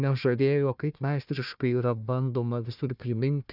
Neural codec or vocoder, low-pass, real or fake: codec, 16 kHz, 1 kbps, FunCodec, trained on Chinese and English, 50 frames a second; 5.4 kHz; fake